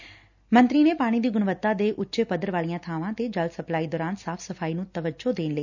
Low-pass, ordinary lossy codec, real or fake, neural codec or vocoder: 7.2 kHz; none; real; none